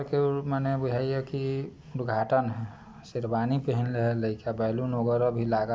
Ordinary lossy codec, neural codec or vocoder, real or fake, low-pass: none; none; real; none